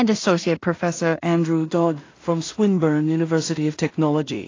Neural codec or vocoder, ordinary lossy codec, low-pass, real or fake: codec, 16 kHz in and 24 kHz out, 0.4 kbps, LongCat-Audio-Codec, two codebook decoder; AAC, 32 kbps; 7.2 kHz; fake